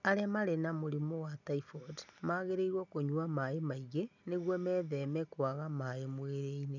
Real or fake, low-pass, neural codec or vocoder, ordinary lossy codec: real; 7.2 kHz; none; none